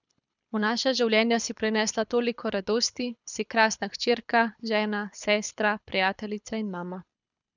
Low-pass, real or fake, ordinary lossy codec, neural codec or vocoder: 7.2 kHz; fake; none; codec, 24 kHz, 6 kbps, HILCodec